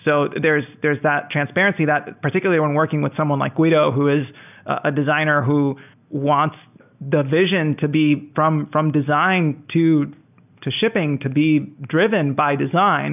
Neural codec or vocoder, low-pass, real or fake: none; 3.6 kHz; real